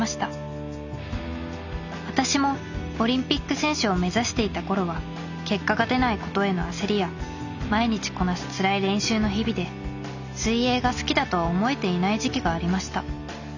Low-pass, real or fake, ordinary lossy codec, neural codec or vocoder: 7.2 kHz; real; none; none